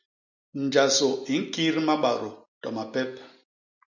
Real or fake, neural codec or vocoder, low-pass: real; none; 7.2 kHz